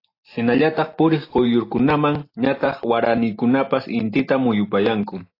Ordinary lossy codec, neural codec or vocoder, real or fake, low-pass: AAC, 32 kbps; none; real; 5.4 kHz